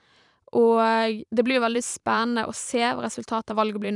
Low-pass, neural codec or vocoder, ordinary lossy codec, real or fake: 10.8 kHz; vocoder, 44.1 kHz, 128 mel bands every 512 samples, BigVGAN v2; none; fake